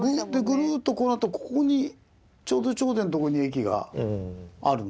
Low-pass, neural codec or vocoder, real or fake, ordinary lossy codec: none; none; real; none